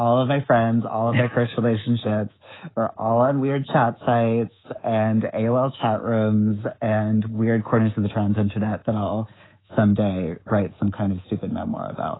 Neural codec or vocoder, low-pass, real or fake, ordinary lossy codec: codec, 24 kHz, 3.1 kbps, DualCodec; 7.2 kHz; fake; AAC, 16 kbps